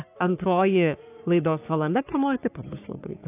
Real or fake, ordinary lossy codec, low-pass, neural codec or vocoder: fake; AAC, 24 kbps; 3.6 kHz; codec, 44.1 kHz, 3.4 kbps, Pupu-Codec